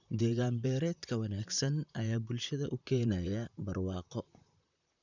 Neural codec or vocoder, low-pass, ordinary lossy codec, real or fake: vocoder, 44.1 kHz, 128 mel bands, Pupu-Vocoder; 7.2 kHz; none; fake